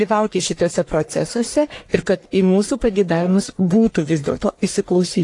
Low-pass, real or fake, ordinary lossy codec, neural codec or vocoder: 10.8 kHz; fake; AAC, 48 kbps; codec, 44.1 kHz, 1.7 kbps, Pupu-Codec